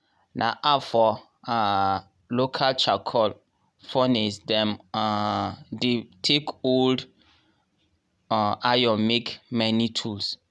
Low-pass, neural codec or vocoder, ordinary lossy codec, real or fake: none; none; none; real